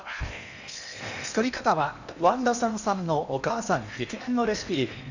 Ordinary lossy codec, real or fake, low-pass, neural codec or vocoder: none; fake; 7.2 kHz; codec, 16 kHz in and 24 kHz out, 0.8 kbps, FocalCodec, streaming, 65536 codes